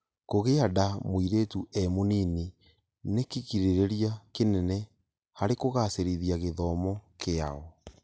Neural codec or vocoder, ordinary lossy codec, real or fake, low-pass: none; none; real; none